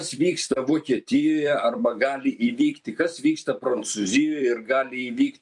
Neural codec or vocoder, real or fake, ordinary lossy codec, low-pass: vocoder, 44.1 kHz, 128 mel bands every 256 samples, BigVGAN v2; fake; MP3, 64 kbps; 10.8 kHz